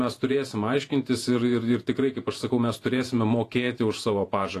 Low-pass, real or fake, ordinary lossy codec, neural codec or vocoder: 14.4 kHz; fake; AAC, 48 kbps; vocoder, 48 kHz, 128 mel bands, Vocos